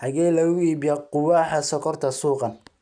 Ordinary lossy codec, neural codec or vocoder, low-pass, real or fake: none; none; 9.9 kHz; real